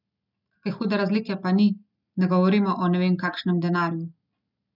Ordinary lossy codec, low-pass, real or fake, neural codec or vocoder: none; 5.4 kHz; real; none